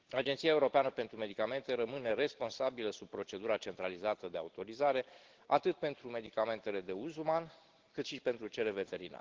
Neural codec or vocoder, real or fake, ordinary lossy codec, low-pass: none; real; Opus, 16 kbps; 7.2 kHz